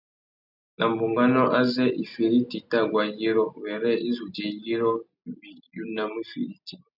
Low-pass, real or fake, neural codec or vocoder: 5.4 kHz; real; none